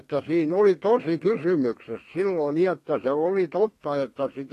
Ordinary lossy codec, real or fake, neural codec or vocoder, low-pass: AAC, 48 kbps; fake; codec, 32 kHz, 1.9 kbps, SNAC; 14.4 kHz